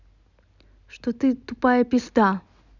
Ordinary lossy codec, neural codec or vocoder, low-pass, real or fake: none; none; 7.2 kHz; real